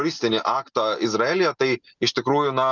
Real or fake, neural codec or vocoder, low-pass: real; none; 7.2 kHz